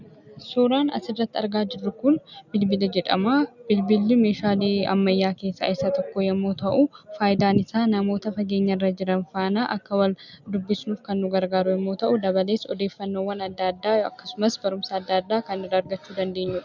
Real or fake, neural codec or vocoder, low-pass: real; none; 7.2 kHz